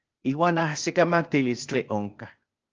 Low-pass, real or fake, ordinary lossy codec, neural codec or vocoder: 7.2 kHz; fake; Opus, 24 kbps; codec, 16 kHz, 0.8 kbps, ZipCodec